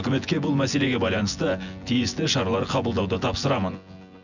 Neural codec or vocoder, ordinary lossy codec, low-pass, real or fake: vocoder, 24 kHz, 100 mel bands, Vocos; none; 7.2 kHz; fake